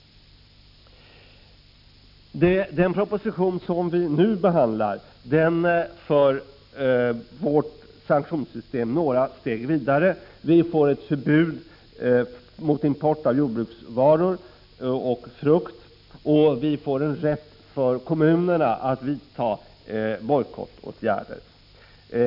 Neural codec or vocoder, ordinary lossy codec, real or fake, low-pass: none; none; real; 5.4 kHz